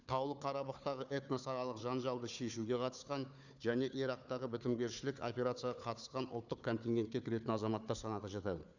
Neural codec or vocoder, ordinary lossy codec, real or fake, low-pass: codec, 16 kHz, 4 kbps, FunCodec, trained on Chinese and English, 50 frames a second; none; fake; 7.2 kHz